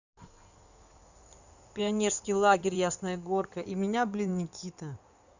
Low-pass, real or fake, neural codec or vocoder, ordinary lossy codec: 7.2 kHz; fake; codec, 16 kHz in and 24 kHz out, 2.2 kbps, FireRedTTS-2 codec; none